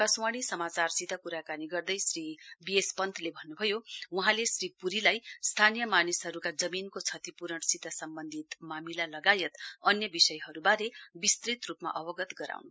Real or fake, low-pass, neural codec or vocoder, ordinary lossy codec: real; none; none; none